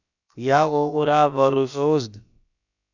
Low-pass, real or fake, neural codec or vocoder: 7.2 kHz; fake; codec, 16 kHz, about 1 kbps, DyCAST, with the encoder's durations